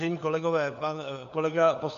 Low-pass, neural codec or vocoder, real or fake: 7.2 kHz; codec, 16 kHz, 4 kbps, FunCodec, trained on LibriTTS, 50 frames a second; fake